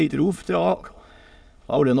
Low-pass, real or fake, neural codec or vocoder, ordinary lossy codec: none; fake; autoencoder, 22.05 kHz, a latent of 192 numbers a frame, VITS, trained on many speakers; none